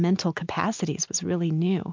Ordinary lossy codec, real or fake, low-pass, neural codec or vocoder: MP3, 64 kbps; real; 7.2 kHz; none